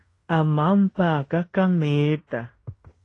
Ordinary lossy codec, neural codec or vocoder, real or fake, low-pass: AAC, 32 kbps; codec, 16 kHz in and 24 kHz out, 0.9 kbps, LongCat-Audio-Codec, fine tuned four codebook decoder; fake; 10.8 kHz